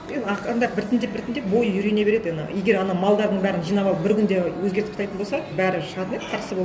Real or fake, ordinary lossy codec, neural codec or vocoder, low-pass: real; none; none; none